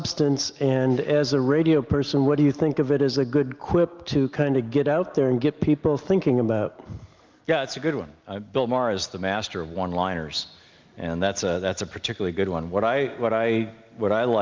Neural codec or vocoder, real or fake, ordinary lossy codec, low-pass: none; real; Opus, 32 kbps; 7.2 kHz